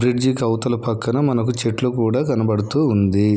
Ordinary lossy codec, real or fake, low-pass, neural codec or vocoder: none; real; none; none